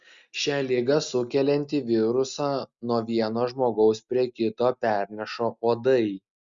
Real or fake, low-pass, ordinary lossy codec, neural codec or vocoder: real; 7.2 kHz; Opus, 64 kbps; none